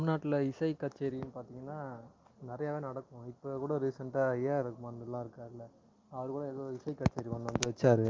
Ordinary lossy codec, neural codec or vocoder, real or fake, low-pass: Opus, 24 kbps; none; real; 7.2 kHz